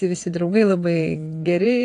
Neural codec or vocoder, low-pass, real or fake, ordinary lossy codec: vocoder, 22.05 kHz, 80 mel bands, WaveNeXt; 9.9 kHz; fake; AAC, 48 kbps